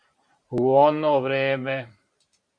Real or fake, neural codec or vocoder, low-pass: fake; vocoder, 44.1 kHz, 128 mel bands every 512 samples, BigVGAN v2; 9.9 kHz